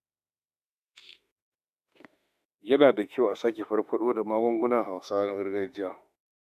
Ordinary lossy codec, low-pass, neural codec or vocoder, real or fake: none; 14.4 kHz; autoencoder, 48 kHz, 32 numbers a frame, DAC-VAE, trained on Japanese speech; fake